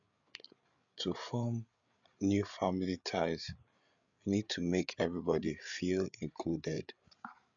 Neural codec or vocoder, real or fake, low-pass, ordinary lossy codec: codec, 16 kHz, 8 kbps, FreqCodec, larger model; fake; 7.2 kHz; none